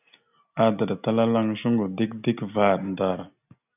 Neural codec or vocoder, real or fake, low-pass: none; real; 3.6 kHz